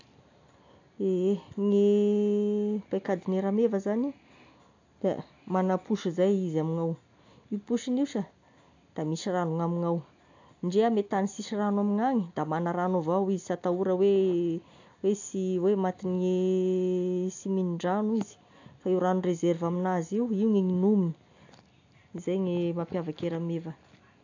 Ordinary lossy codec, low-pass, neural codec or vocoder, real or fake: AAC, 48 kbps; 7.2 kHz; none; real